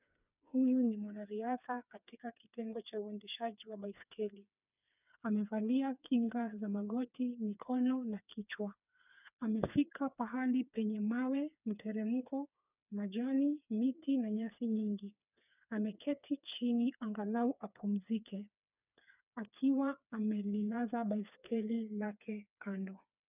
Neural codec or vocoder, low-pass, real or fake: codec, 16 kHz, 4 kbps, FreqCodec, smaller model; 3.6 kHz; fake